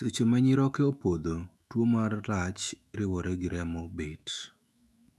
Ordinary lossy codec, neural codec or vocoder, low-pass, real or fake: none; autoencoder, 48 kHz, 128 numbers a frame, DAC-VAE, trained on Japanese speech; 14.4 kHz; fake